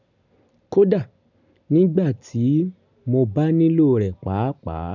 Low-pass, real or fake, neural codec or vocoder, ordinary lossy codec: 7.2 kHz; real; none; none